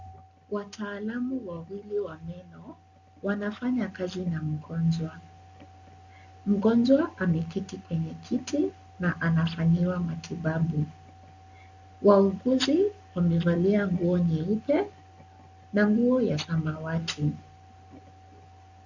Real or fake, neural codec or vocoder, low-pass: real; none; 7.2 kHz